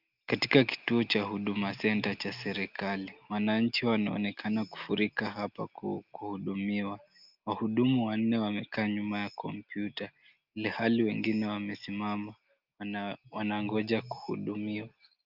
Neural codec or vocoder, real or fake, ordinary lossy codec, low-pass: none; real; Opus, 24 kbps; 5.4 kHz